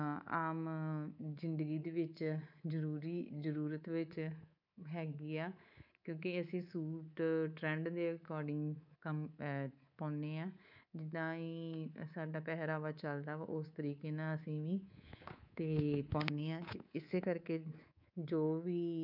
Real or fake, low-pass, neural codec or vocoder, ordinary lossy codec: fake; 5.4 kHz; codec, 24 kHz, 3.1 kbps, DualCodec; none